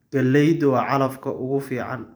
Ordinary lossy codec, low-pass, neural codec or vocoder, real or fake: none; none; vocoder, 44.1 kHz, 128 mel bands every 512 samples, BigVGAN v2; fake